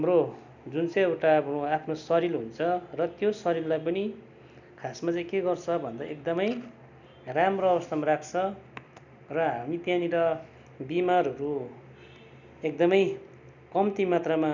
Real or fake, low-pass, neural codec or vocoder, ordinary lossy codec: real; 7.2 kHz; none; none